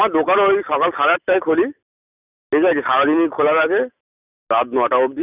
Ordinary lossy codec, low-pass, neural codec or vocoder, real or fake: none; 3.6 kHz; none; real